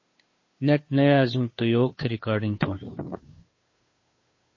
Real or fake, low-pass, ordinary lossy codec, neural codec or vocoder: fake; 7.2 kHz; MP3, 32 kbps; codec, 16 kHz, 2 kbps, FunCodec, trained on Chinese and English, 25 frames a second